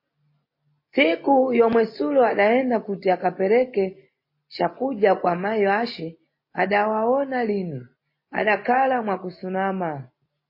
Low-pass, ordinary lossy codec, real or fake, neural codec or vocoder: 5.4 kHz; MP3, 24 kbps; real; none